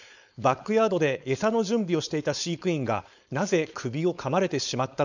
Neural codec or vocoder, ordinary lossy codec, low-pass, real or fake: codec, 16 kHz, 4.8 kbps, FACodec; none; 7.2 kHz; fake